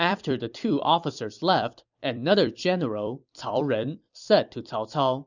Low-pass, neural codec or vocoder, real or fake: 7.2 kHz; vocoder, 44.1 kHz, 128 mel bands every 256 samples, BigVGAN v2; fake